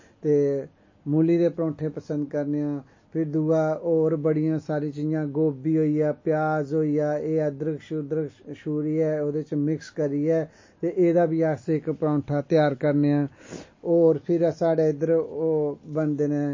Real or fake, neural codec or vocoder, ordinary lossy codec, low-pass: real; none; MP3, 32 kbps; 7.2 kHz